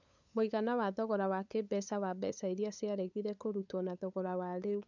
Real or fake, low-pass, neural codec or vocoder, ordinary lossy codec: fake; 7.2 kHz; codec, 16 kHz, 8 kbps, FunCodec, trained on LibriTTS, 25 frames a second; none